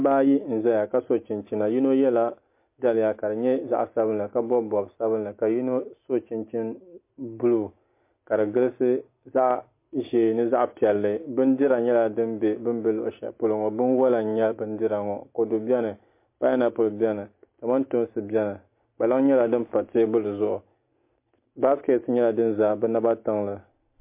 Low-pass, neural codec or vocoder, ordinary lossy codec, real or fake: 3.6 kHz; none; MP3, 24 kbps; real